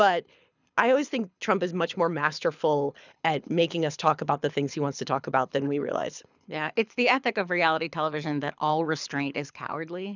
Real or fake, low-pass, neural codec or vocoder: fake; 7.2 kHz; codec, 24 kHz, 6 kbps, HILCodec